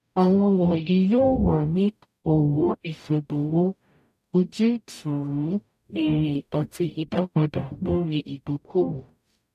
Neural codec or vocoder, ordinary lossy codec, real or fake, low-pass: codec, 44.1 kHz, 0.9 kbps, DAC; none; fake; 14.4 kHz